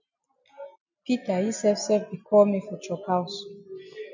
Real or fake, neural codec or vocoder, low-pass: real; none; 7.2 kHz